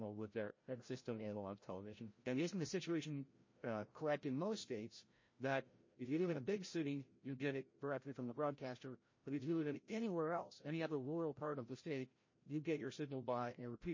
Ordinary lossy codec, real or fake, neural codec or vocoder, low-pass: MP3, 32 kbps; fake; codec, 16 kHz, 0.5 kbps, FreqCodec, larger model; 7.2 kHz